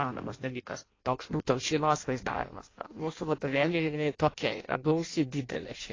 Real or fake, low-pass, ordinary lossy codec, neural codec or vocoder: fake; 7.2 kHz; AAC, 32 kbps; codec, 16 kHz in and 24 kHz out, 0.6 kbps, FireRedTTS-2 codec